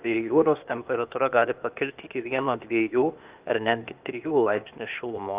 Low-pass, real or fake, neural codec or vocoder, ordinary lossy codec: 3.6 kHz; fake; codec, 16 kHz, 0.8 kbps, ZipCodec; Opus, 24 kbps